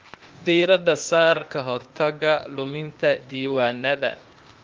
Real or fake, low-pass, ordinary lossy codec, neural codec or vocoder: fake; 7.2 kHz; Opus, 32 kbps; codec, 16 kHz, 0.8 kbps, ZipCodec